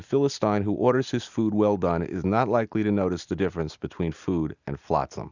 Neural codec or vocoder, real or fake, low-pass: none; real; 7.2 kHz